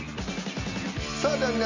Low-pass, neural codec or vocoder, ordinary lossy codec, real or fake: 7.2 kHz; none; MP3, 64 kbps; real